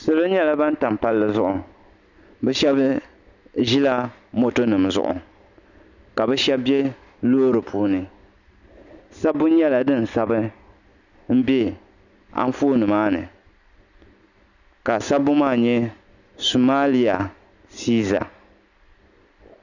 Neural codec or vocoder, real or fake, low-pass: none; real; 7.2 kHz